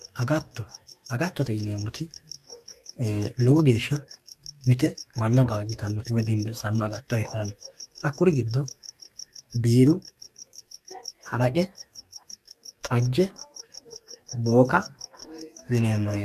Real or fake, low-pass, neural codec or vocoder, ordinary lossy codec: fake; 14.4 kHz; codec, 44.1 kHz, 2.6 kbps, DAC; MP3, 96 kbps